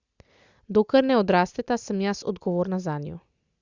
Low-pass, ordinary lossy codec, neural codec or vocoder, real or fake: 7.2 kHz; Opus, 64 kbps; none; real